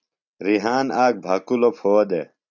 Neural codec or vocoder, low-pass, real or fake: none; 7.2 kHz; real